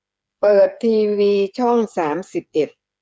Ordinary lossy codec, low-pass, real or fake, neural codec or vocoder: none; none; fake; codec, 16 kHz, 8 kbps, FreqCodec, smaller model